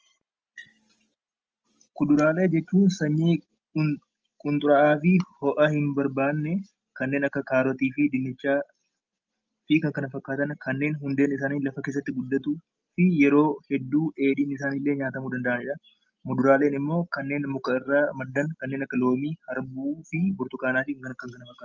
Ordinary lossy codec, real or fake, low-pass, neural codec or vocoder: Opus, 24 kbps; real; 7.2 kHz; none